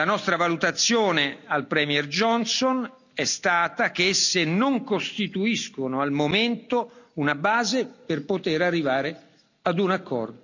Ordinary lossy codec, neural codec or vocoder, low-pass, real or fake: none; none; 7.2 kHz; real